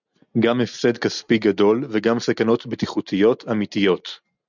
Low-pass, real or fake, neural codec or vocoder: 7.2 kHz; real; none